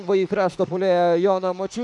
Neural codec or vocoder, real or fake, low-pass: autoencoder, 48 kHz, 32 numbers a frame, DAC-VAE, trained on Japanese speech; fake; 10.8 kHz